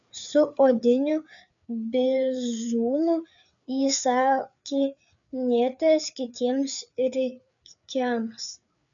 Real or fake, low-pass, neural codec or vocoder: fake; 7.2 kHz; codec, 16 kHz, 4 kbps, FreqCodec, larger model